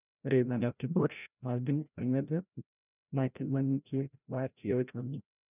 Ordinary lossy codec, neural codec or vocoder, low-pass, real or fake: none; codec, 16 kHz, 0.5 kbps, FreqCodec, larger model; 3.6 kHz; fake